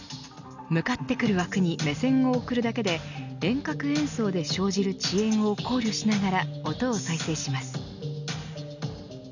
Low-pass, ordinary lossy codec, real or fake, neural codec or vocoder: 7.2 kHz; none; real; none